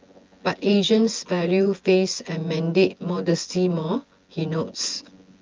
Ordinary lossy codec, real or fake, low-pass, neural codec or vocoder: Opus, 24 kbps; fake; 7.2 kHz; vocoder, 24 kHz, 100 mel bands, Vocos